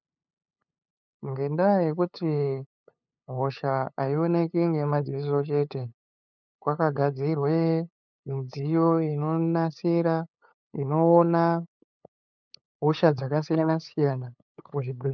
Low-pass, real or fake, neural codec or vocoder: 7.2 kHz; fake; codec, 16 kHz, 8 kbps, FunCodec, trained on LibriTTS, 25 frames a second